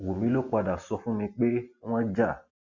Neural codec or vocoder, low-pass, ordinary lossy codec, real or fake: none; 7.2 kHz; Opus, 64 kbps; real